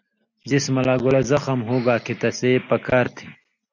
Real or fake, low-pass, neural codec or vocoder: real; 7.2 kHz; none